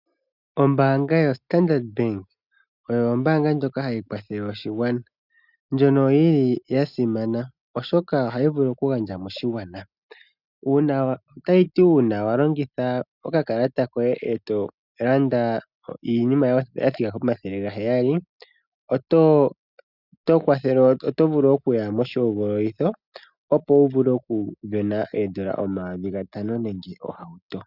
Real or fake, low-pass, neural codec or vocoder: real; 5.4 kHz; none